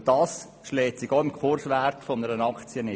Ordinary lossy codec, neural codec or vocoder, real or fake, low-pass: none; none; real; none